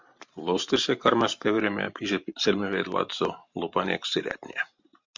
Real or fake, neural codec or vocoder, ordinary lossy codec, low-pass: fake; vocoder, 44.1 kHz, 128 mel bands every 512 samples, BigVGAN v2; MP3, 64 kbps; 7.2 kHz